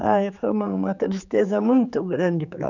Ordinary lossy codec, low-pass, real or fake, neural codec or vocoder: none; 7.2 kHz; fake; codec, 16 kHz, 4 kbps, X-Codec, HuBERT features, trained on general audio